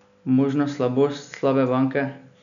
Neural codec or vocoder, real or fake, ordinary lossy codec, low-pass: none; real; none; 7.2 kHz